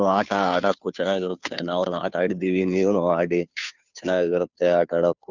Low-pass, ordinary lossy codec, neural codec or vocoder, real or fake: 7.2 kHz; none; codec, 16 kHz, 2 kbps, FunCodec, trained on Chinese and English, 25 frames a second; fake